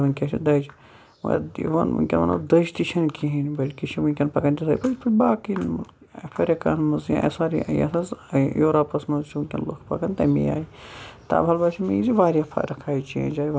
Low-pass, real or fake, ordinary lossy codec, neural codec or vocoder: none; real; none; none